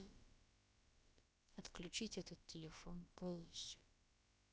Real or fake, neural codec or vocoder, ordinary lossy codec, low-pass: fake; codec, 16 kHz, about 1 kbps, DyCAST, with the encoder's durations; none; none